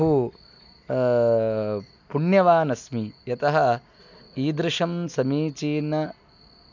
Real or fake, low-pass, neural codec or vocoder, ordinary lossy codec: real; 7.2 kHz; none; none